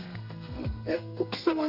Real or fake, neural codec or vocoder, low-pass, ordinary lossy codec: fake; codec, 32 kHz, 1.9 kbps, SNAC; 5.4 kHz; none